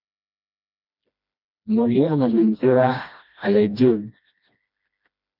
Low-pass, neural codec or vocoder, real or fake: 5.4 kHz; codec, 16 kHz, 1 kbps, FreqCodec, smaller model; fake